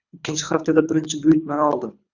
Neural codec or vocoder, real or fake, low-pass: codec, 24 kHz, 3 kbps, HILCodec; fake; 7.2 kHz